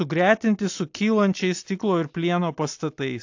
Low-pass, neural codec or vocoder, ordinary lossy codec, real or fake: 7.2 kHz; none; AAC, 48 kbps; real